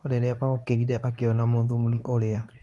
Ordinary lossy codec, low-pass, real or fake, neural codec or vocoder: none; none; fake; codec, 24 kHz, 0.9 kbps, WavTokenizer, medium speech release version 2